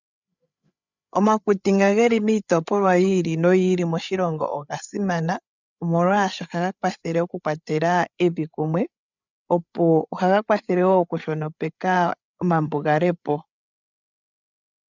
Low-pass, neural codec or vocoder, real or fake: 7.2 kHz; codec, 16 kHz, 8 kbps, FreqCodec, larger model; fake